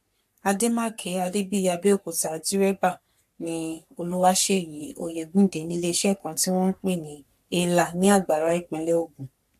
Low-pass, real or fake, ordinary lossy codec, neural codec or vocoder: 14.4 kHz; fake; AAC, 96 kbps; codec, 44.1 kHz, 3.4 kbps, Pupu-Codec